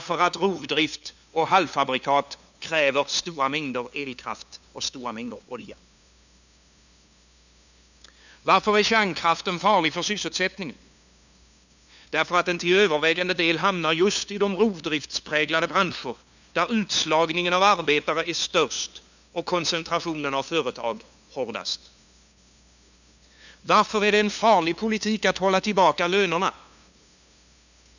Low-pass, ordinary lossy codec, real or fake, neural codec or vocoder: 7.2 kHz; none; fake; codec, 16 kHz, 2 kbps, FunCodec, trained on LibriTTS, 25 frames a second